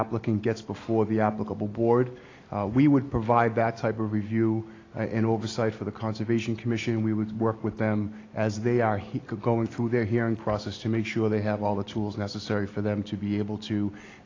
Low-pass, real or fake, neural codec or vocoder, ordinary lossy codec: 7.2 kHz; real; none; AAC, 32 kbps